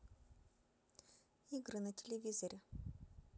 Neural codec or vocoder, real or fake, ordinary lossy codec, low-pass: none; real; none; none